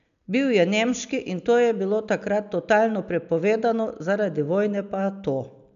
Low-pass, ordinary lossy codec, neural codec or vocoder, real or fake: 7.2 kHz; none; none; real